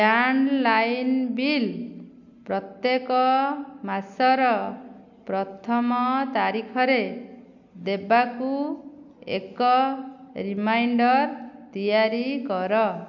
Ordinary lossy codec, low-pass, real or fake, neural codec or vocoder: none; 7.2 kHz; real; none